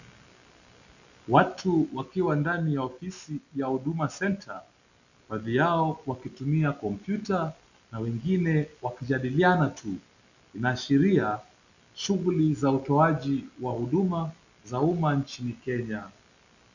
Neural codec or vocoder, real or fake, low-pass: none; real; 7.2 kHz